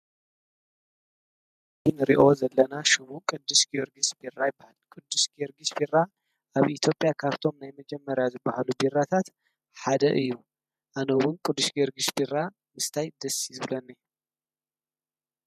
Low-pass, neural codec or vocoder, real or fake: 14.4 kHz; vocoder, 48 kHz, 128 mel bands, Vocos; fake